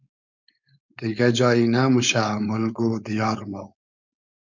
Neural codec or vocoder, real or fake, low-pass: codec, 16 kHz, 4.8 kbps, FACodec; fake; 7.2 kHz